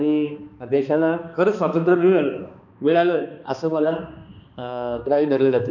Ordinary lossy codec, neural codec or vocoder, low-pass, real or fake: none; codec, 16 kHz, 2 kbps, X-Codec, HuBERT features, trained on balanced general audio; 7.2 kHz; fake